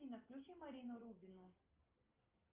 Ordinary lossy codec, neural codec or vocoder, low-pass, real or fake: Opus, 24 kbps; none; 3.6 kHz; real